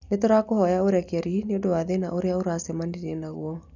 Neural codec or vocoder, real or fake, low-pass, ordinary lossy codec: none; real; 7.2 kHz; none